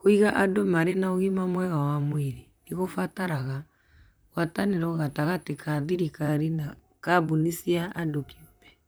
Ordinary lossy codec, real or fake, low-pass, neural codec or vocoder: none; fake; none; vocoder, 44.1 kHz, 128 mel bands, Pupu-Vocoder